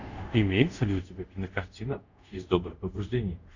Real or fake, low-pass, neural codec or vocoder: fake; 7.2 kHz; codec, 24 kHz, 0.5 kbps, DualCodec